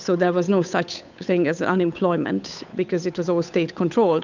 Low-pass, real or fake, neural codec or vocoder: 7.2 kHz; fake; codec, 16 kHz, 8 kbps, FunCodec, trained on Chinese and English, 25 frames a second